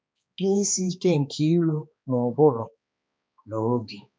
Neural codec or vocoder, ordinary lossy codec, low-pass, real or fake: codec, 16 kHz, 2 kbps, X-Codec, HuBERT features, trained on balanced general audio; none; none; fake